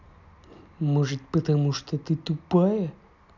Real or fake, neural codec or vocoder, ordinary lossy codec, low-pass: real; none; none; 7.2 kHz